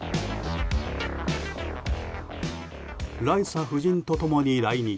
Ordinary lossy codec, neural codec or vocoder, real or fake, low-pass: none; none; real; none